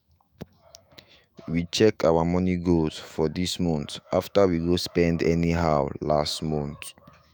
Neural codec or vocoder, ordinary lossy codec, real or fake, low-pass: autoencoder, 48 kHz, 128 numbers a frame, DAC-VAE, trained on Japanese speech; none; fake; none